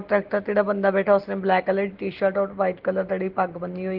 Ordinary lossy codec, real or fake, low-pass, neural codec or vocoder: Opus, 16 kbps; real; 5.4 kHz; none